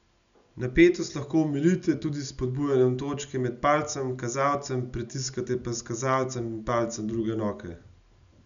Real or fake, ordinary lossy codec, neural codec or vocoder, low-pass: real; none; none; 7.2 kHz